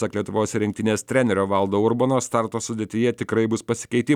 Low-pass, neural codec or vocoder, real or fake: 19.8 kHz; none; real